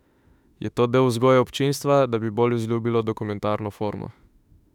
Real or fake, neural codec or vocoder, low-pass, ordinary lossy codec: fake; autoencoder, 48 kHz, 32 numbers a frame, DAC-VAE, trained on Japanese speech; 19.8 kHz; none